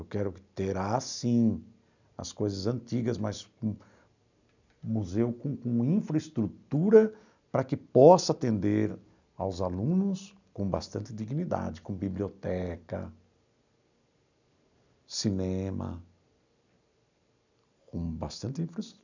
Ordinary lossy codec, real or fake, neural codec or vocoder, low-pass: none; real; none; 7.2 kHz